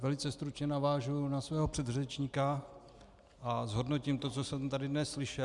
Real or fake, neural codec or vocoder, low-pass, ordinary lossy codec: real; none; 10.8 kHz; Opus, 64 kbps